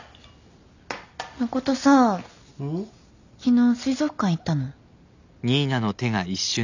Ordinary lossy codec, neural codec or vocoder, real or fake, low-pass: none; none; real; 7.2 kHz